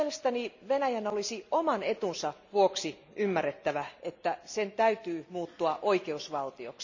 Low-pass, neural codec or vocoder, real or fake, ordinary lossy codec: 7.2 kHz; none; real; none